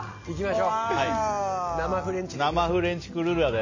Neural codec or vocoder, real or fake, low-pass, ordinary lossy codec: none; real; 7.2 kHz; MP3, 32 kbps